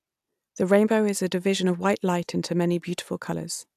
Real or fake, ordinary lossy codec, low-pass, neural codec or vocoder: real; AAC, 96 kbps; 14.4 kHz; none